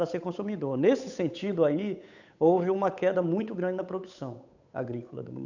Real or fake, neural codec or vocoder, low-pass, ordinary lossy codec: fake; codec, 16 kHz, 8 kbps, FunCodec, trained on Chinese and English, 25 frames a second; 7.2 kHz; none